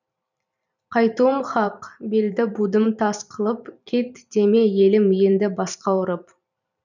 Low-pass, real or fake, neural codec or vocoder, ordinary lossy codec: 7.2 kHz; fake; vocoder, 44.1 kHz, 128 mel bands every 512 samples, BigVGAN v2; none